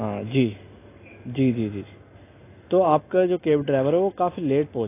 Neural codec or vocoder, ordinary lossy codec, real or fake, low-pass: none; AAC, 24 kbps; real; 3.6 kHz